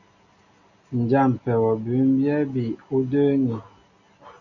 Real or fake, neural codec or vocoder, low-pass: real; none; 7.2 kHz